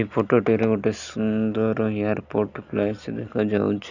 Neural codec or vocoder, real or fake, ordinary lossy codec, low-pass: none; real; none; 7.2 kHz